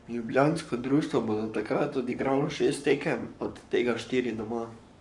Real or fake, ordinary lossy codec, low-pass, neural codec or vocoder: fake; none; 10.8 kHz; codec, 44.1 kHz, 7.8 kbps, Pupu-Codec